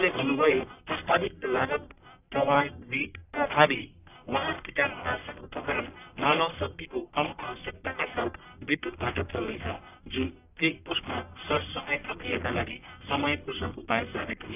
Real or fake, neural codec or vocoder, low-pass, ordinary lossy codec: fake; codec, 44.1 kHz, 1.7 kbps, Pupu-Codec; 3.6 kHz; none